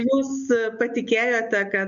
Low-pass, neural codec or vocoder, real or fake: 7.2 kHz; none; real